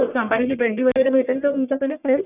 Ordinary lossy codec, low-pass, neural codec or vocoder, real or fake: none; 3.6 kHz; codec, 44.1 kHz, 1.7 kbps, Pupu-Codec; fake